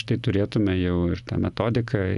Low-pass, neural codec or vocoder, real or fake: 10.8 kHz; none; real